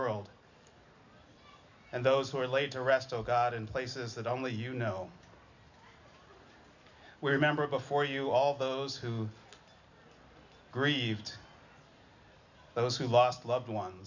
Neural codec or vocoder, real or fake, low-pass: none; real; 7.2 kHz